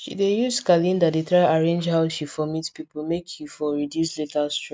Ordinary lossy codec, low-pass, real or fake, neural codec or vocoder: none; none; real; none